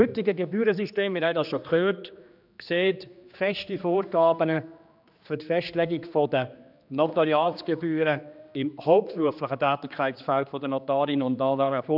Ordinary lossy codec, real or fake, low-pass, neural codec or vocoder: none; fake; 5.4 kHz; codec, 16 kHz, 2 kbps, X-Codec, HuBERT features, trained on general audio